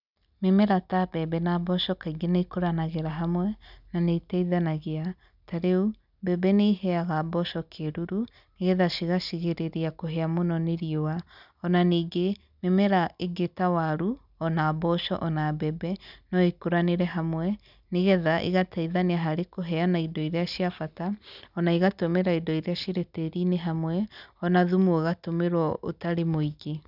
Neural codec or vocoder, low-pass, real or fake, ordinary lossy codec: none; 5.4 kHz; real; none